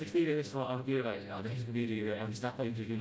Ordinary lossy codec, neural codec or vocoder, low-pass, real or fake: none; codec, 16 kHz, 0.5 kbps, FreqCodec, smaller model; none; fake